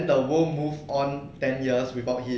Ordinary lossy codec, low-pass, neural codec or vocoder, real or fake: none; none; none; real